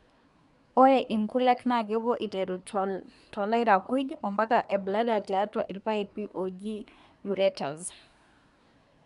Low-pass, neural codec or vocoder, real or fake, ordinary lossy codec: 10.8 kHz; codec, 24 kHz, 1 kbps, SNAC; fake; none